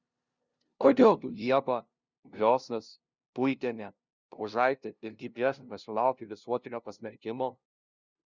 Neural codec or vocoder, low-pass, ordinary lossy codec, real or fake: codec, 16 kHz, 0.5 kbps, FunCodec, trained on LibriTTS, 25 frames a second; 7.2 kHz; Opus, 64 kbps; fake